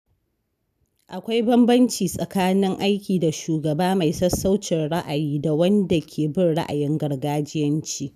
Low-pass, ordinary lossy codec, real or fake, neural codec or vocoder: 14.4 kHz; none; real; none